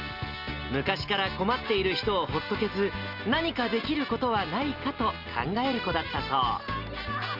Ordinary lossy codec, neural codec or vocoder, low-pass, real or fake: Opus, 32 kbps; none; 5.4 kHz; real